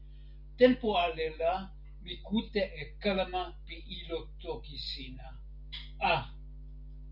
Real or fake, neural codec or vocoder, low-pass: real; none; 5.4 kHz